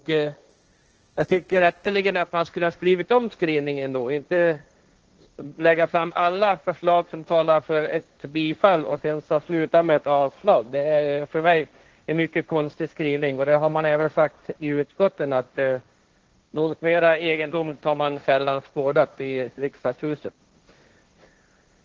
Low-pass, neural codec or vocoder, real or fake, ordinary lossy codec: 7.2 kHz; codec, 16 kHz, 1.1 kbps, Voila-Tokenizer; fake; Opus, 16 kbps